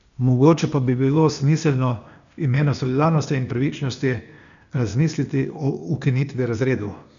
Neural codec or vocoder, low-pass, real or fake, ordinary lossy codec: codec, 16 kHz, 0.8 kbps, ZipCodec; 7.2 kHz; fake; none